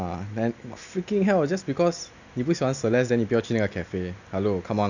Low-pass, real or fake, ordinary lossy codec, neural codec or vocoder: 7.2 kHz; real; none; none